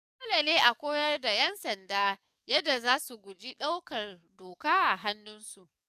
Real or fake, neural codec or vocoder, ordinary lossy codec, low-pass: fake; codec, 44.1 kHz, 7.8 kbps, DAC; none; 14.4 kHz